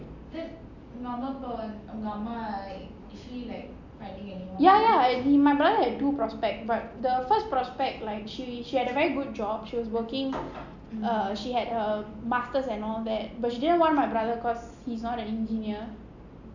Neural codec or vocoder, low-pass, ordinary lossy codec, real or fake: none; 7.2 kHz; none; real